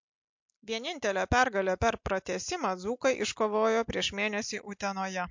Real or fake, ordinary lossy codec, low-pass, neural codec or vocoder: real; MP3, 48 kbps; 7.2 kHz; none